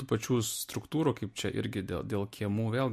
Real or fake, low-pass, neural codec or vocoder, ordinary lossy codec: real; 14.4 kHz; none; MP3, 64 kbps